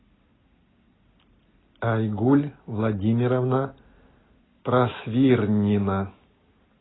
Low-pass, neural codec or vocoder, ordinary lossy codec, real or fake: 7.2 kHz; none; AAC, 16 kbps; real